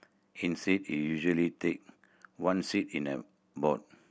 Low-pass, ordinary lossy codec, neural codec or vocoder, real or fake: none; none; none; real